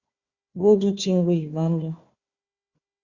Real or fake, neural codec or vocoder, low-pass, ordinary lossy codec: fake; codec, 16 kHz, 1 kbps, FunCodec, trained on Chinese and English, 50 frames a second; 7.2 kHz; Opus, 64 kbps